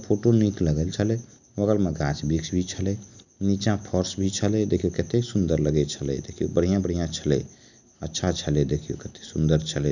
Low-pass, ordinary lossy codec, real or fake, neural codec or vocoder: 7.2 kHz; none; real; none